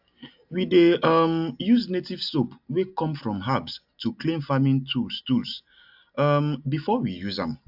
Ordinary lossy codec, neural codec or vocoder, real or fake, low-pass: AAC, 48 kbps; none; real; 5.4 kHz